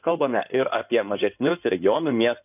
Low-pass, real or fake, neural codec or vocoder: 3.6 kHz; fake; codec, 16 kHz in and 24 kHz out, 2.2 kbps, FireRedTTS-2 codec